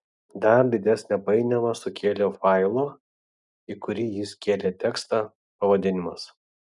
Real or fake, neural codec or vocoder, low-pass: real; none; 10.8 kHz